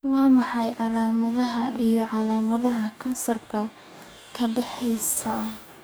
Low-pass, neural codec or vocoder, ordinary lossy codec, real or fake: none; codec, 44.1 kHz, 2.6 kbps, DAC; none; fake